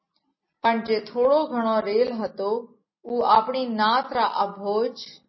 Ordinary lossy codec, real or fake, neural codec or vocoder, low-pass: MP3, 24 kbps; real; none; 7.2 kHz